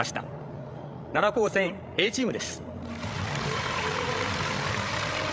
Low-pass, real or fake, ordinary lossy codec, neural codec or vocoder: none; fake; none; codec, 16 kHz, 16 kbps, FreqCodec, larger model